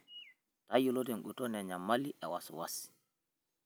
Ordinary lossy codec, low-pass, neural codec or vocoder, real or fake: none; none; none; real